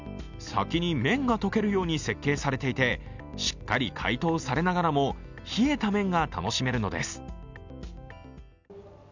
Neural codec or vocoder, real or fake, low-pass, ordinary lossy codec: none; real; 7.2 kHz; none